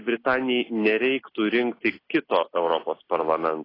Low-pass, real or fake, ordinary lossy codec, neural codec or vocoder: 5.4 kHz; real; AAC, 24 kbps; none